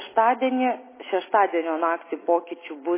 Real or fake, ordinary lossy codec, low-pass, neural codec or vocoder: real; MP3, 16 kbps; 3.6 kHz; none